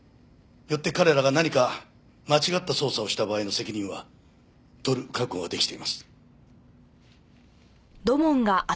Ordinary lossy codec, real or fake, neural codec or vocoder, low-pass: none; real; none; none